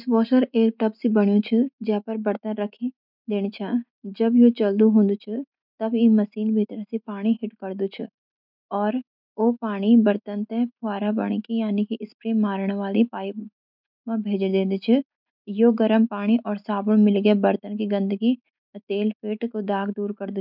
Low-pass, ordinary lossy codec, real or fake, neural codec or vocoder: 5.4 kHz; none; real; none